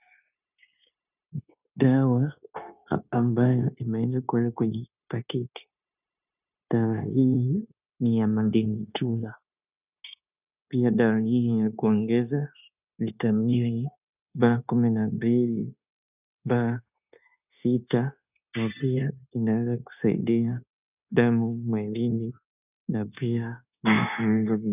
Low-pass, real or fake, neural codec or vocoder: 3.6 kHz; fake; codec, 16 kHz, 0.9 kbps, LongCat-Audio-Codec